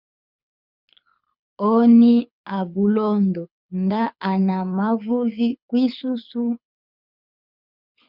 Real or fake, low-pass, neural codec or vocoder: fake; 5.4 kHz; codec, 24 kHz, 6 kbps, HILCodec